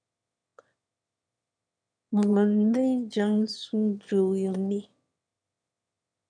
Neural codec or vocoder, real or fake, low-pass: autoencoder, 22.05 kHz, a latent of 192 numbers a frame, VITS, trained on one speaker; fake; 9.9 kHz